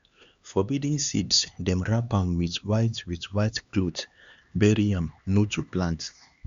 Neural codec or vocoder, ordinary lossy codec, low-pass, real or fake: codec, 16 kHz, 2 kbps, X-Codec, HuBERT features, trained on LibriSpeech; Opus, 64 kbps; 7.2 kHz; fake